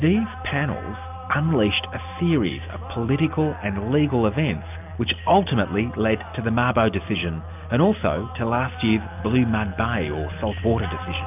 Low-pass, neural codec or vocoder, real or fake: 3.6 kHz; none; real